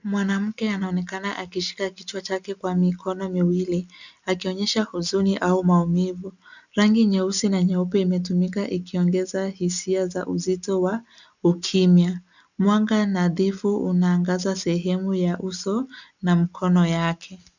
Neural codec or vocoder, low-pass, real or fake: none; 7.2 kHz; real